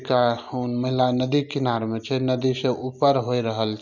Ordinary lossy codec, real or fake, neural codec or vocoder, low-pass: none; real; none; 7.2 kHz